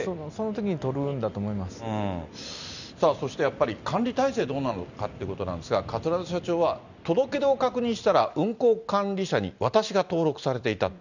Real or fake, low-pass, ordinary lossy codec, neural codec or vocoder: real; 7.2 kHz; none; none